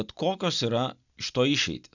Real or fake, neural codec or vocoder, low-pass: real; none; 7.2 kHz